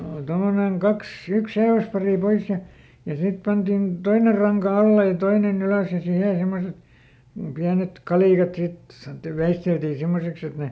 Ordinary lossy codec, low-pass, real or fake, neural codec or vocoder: none; none; real; none